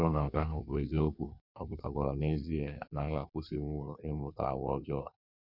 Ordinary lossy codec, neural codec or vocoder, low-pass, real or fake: none; codec, 16 kHz in and 24 kHz out, 1.1 kbps, FireRedTTS-2 codec; 5.4 kHz; fake